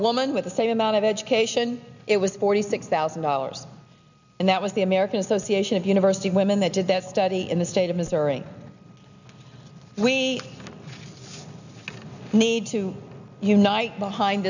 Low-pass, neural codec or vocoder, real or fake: 7.2 kHz; none; real